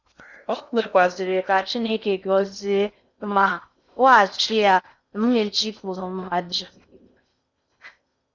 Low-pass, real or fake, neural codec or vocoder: 7.2 kHz; fake; codec, 16 kHz in and 24 kHz out, 0.6 kbps, FocalCodec, streaming, 2048 codes